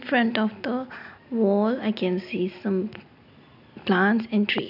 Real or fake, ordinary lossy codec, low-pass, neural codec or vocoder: real; none; 5.4 kHz; none